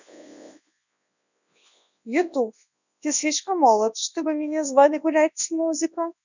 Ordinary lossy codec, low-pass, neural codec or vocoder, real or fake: none; 7.2 kHz; codec, 24 kHz, 0.9 kbps, WavTokenizer, large speech release; fake